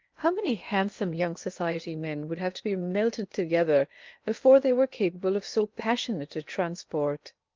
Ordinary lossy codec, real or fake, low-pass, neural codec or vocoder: Opus, 32 kbps; fake; 7.2 kHz; codec, 16 kHz in and 24 kHz out, 0.8 kbps, FocalCodec, streaming, 65536 codes